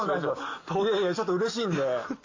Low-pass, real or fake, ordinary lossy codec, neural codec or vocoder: 7.2 kHz; real; AAC, 48 kbps; none